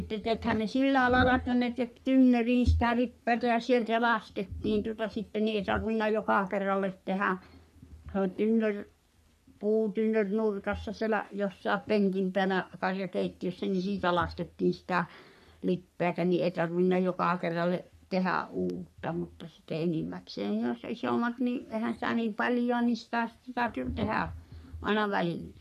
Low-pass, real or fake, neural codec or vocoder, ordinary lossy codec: 14.4 kHz; fake; codec, 44.1 kHz, 3.4 kbps, Pupu-Codec; none